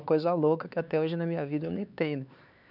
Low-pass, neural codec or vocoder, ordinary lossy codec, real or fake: 5.4 kHz; codec, 16 kHz, 4 kbps, X-Codec, HuBERT features, trained on LibriSpeech; none; fake